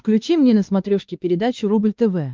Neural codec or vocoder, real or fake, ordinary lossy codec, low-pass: codec, 16 kHz, 2 kbps, X-Codec, WavLM features, trained on Multilingual LibriSpeech; fake; Opus, 24 kbps; 7.2 kHz